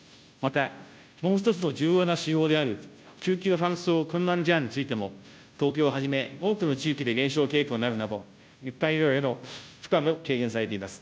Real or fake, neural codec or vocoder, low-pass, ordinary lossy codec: fake; codec, 16 kHz, 0.5 kbps, FunCodec, trained on Chinese and English, 25 frames a second; none; none